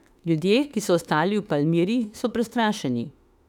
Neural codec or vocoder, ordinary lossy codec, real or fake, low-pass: autoencoder, 48 kHz, 32 numbers a frame, DAC-VAE, trained on Japanese speech; none; fake; 19.8 kHz